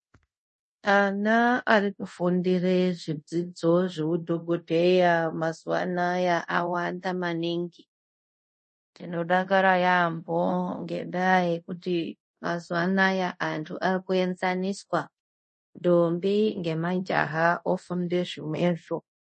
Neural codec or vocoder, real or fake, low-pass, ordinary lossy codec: codec, 24 kHz, 0.5 kbps, DualCodec; fake; 10.8 kHz; MP3, 32 kbps